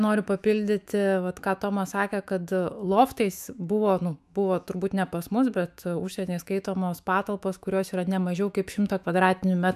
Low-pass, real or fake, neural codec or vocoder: 14.4 kHz; fake; autoencoder, 48 kHz, 128 numbers a frame, DAC-VAE, trained on Japanese speech